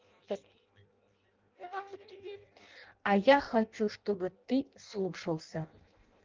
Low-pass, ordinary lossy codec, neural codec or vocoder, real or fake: 7.2 kHz; Opus, 32 kbps; codec, 16 kHz in and 24 kHz out, 0.6 kbps, FireRedTTS-2 codec; fake